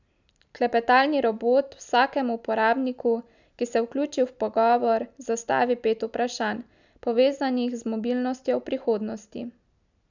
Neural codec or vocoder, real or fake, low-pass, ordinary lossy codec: none; real; 7.2 kHz; none